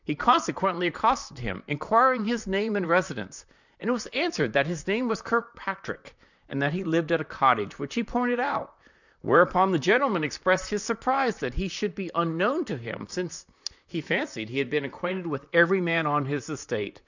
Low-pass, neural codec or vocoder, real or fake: 7.2 kHz; vocoder, 44.1 kHz, 128 mel bands, Pupu-Vocoder; fake